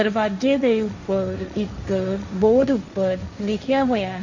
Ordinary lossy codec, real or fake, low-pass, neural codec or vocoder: none; fake; 7.2 kHz; codec, 16 kHz, 1.1 kbps, Voila-Tokenizer